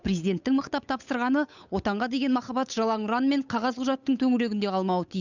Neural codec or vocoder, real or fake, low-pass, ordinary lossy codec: codec, 16 kHz, 8 kbps, FunCodec, trained on Chinese and English, 25 frames a second; fake; 7.2 kHz; none